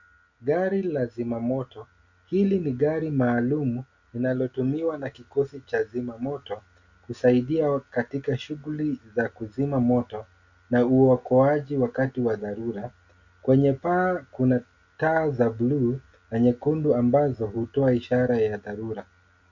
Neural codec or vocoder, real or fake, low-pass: none; real; 7.2 kHz